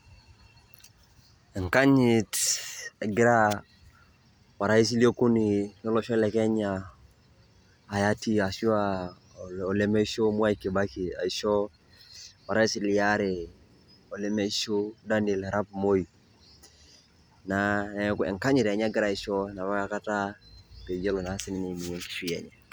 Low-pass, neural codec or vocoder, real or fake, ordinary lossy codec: none; none; real; none